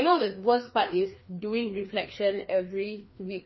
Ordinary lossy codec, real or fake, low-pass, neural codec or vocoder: MP3, 24 kbps; fake; 7.2 kHz; codec, 16 kHz, 2 kbps, FreqCodec, larger model